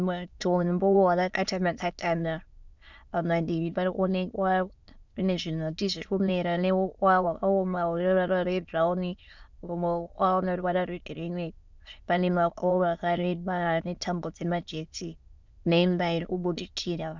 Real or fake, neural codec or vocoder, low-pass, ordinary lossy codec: fake; autoencoder, 22.05 kHz, a latent of 192 numbers a frame, VITS, trained on many speakers; 7.2 kHz; Opus, 64 kbps